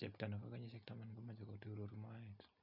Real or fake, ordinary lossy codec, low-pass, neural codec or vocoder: real; none; 5.4 kHz; none